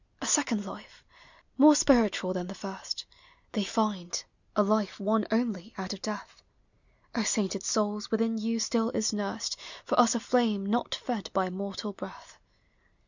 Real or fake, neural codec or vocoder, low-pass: real; none; 7.2 kHz